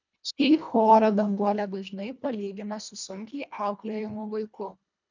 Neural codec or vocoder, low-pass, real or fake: codec, 24 kHz, 1.5 kbps, HILCodec; 7.2 kHz; fake